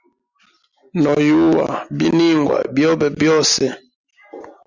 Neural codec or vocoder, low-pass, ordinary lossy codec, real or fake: none; 7.2 kHz; Opus, 64 kbps; real